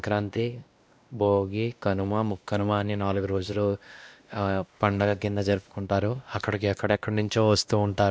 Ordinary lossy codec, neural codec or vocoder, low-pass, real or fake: none; codec, 16 kHz, 1 kbps, X-Codec, WavLM features, trained on Multilingual LibriSpeech; none; fake